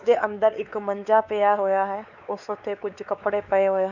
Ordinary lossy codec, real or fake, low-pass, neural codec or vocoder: none; fake; 7.2 kHz; codec, 16 kHz, 4 kbps, X-Codec, WavLM features, trained on Multilingual LibriSpeech